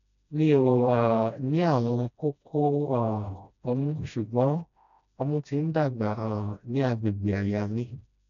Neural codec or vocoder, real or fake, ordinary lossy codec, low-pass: codec, 16 kHz, 1 kbps, FreqCodec, smaller model; fake; none; 7.2 kHz